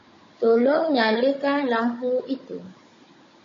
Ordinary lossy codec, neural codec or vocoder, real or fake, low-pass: MP3, 32 kbps; codec, 16 kHz, 16 kbps, FunCodec, trained on Chinese and English, 50 frames a second; fake; 7.2 kHz